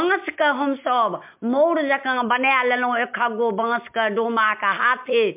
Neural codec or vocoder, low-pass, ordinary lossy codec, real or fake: none; 3.6 kHz; MP3, 32 kbps; real